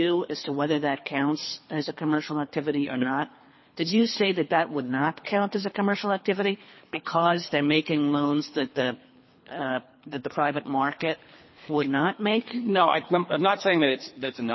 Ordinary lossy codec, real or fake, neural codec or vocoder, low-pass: MP3, 24 kbps; fake; codec, 24 kHz, 3 kbps, HILCodec; 7.2 kHz